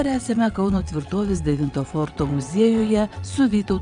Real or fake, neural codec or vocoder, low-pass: fake; vocoder, 22.05 kHz, 80 mel bands, WaveNeXt; 9.9 kHz